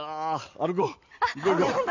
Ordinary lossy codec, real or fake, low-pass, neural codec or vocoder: none; real; 7.2 kHz; none